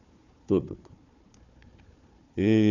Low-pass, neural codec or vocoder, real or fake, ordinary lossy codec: 7.2 kHz; codec, 16 kHz, 4 kbps, FunCodec, trained on Chinese and English, 50 frames a second; fake; none